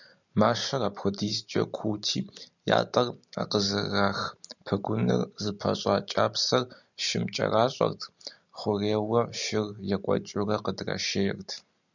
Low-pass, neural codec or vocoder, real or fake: 7.2 kHz; none; real